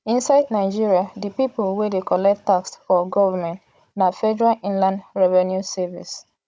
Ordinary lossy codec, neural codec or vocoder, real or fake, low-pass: none; codec, 16 kHz, 16 kbps, FunCodec, trained on Chinese and English, 50 frames a second; fake; none